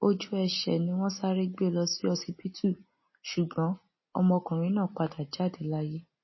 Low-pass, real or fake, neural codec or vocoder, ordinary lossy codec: 7.2 kHz; real; none; MP3, 24 kbps